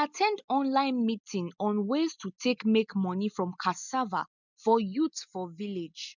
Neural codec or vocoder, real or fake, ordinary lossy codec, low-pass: none; real; none; 7.2 kHz